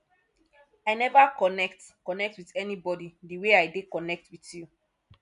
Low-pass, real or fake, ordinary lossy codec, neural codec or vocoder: 10.8 kHz; real; none; none